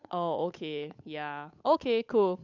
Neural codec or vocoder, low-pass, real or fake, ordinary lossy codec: codec, 16 kHz, 8 kbps, FunCodec, trained on Chinese and English, 25 frames a second; 7.2 kHz; fake; none